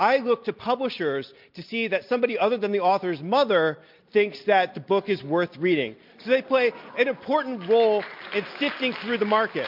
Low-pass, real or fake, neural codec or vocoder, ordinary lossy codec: 5.4 kHz; real; none; MP3, 48 kbps